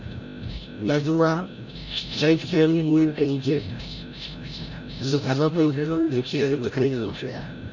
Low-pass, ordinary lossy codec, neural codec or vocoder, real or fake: 7.2 kHz; none; codec, 16 kHz, 0.5 kbps, FreqCodec, larger model; fake